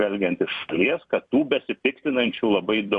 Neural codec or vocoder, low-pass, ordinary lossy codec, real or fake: vocoder, 44.1 kHz, 128 mel bands every 256 samples, BigVGAN v2; 10.8 kHz; MP3, 64 kbps; fake